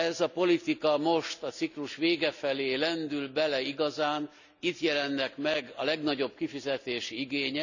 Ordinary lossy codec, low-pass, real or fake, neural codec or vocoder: none; 7.2 kHz; real; none